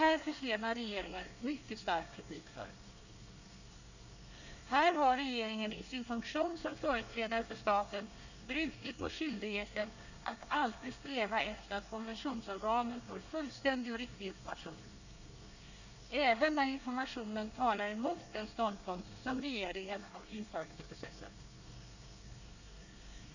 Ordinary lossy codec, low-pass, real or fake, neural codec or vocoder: none; 7.2 kHz; fake; codec, 24 kHz, 1 kbps, SNAC